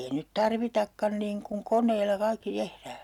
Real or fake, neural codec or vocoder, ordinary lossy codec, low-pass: real; none; none; 19.8 kHz